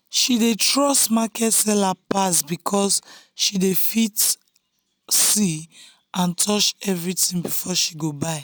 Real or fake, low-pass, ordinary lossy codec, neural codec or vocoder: real; none; none; none